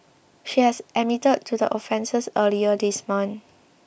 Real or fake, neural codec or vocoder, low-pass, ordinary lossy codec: real; none; none; none